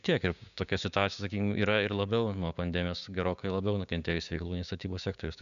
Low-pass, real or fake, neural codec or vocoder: 7.2 kHz; fake; codec, 16 kHz, 6 kbps, DAC